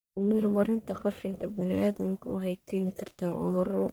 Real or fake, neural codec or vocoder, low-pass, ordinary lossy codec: fake; codec, 44.1 kHz, 1.7 kbps, Pupu-Codec; none; none